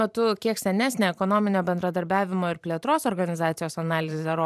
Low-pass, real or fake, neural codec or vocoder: 14.4 kHz; fake; vocoder, 44.1 kHz, 128 mel bands every 512 samples, BigVGAN v2